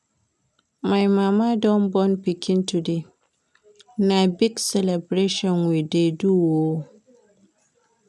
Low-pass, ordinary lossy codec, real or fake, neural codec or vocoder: none; none; real; none